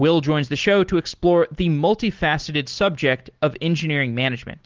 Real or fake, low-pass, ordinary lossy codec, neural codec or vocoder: real; 7.2 kHz; Opus, 16 kbps; none